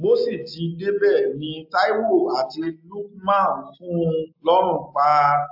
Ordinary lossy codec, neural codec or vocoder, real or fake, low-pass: AAC, 48 kbps; none; real; 5.4 kHz